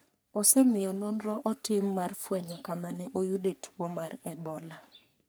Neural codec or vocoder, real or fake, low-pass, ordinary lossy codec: codec, 44.1 kHz, 3.4 kbps, Pupu-Codec; fake; none; none